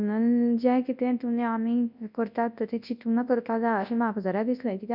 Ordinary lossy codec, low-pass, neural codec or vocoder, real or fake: none; 5.4 kHz; codec, 24 kHz, 0.9 kbps, WavTokenizer, large speech release; fake